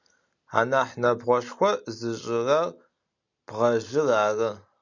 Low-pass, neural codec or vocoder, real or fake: 7.2 kHz; none; real